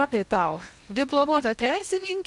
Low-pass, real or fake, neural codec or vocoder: 10.8 kHz; fake; codec, 16 kHz in and 24 kHz out, 0.8 kbps, FocalCodec, streaming, 65536 codes